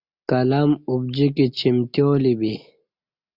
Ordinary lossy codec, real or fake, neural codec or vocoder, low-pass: Opus, 64 kbps; real; none; 5.4 kHz